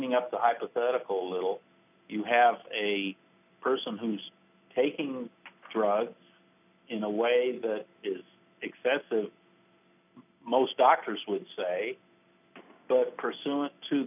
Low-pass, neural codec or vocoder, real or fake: 3.6 kHz; none; real